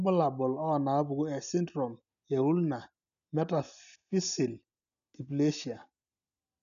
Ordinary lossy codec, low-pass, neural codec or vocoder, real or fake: none; 7.2 kHz; none; real